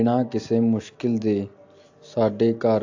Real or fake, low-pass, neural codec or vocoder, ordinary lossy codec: real; 7.2 kHz; none; AAC, 48 kbps